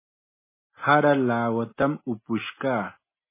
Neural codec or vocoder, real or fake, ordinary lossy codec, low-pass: none; real; MP3, 16 kbps; 3.6 kHz